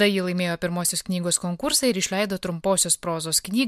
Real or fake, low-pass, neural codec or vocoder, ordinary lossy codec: real; 14.4 kHz; none; MP3, 96 kbps